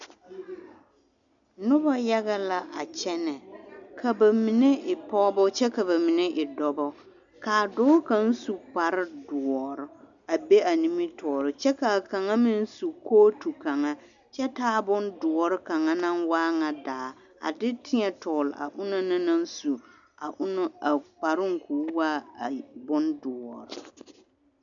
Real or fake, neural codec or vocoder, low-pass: real; none; 7.2 kHz